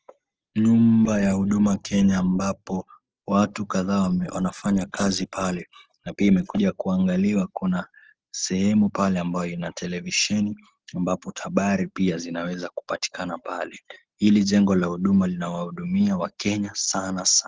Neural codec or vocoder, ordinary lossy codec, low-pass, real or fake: none; Opus, 16 kbps; 7.2 kHz; real